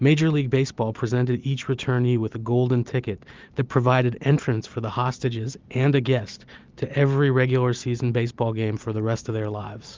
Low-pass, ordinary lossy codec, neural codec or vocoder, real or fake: 7.2 kHz; Opus, 32 kbps; none; real